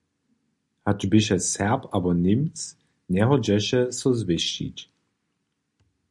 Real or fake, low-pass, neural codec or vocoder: real; 10.8 kHz; none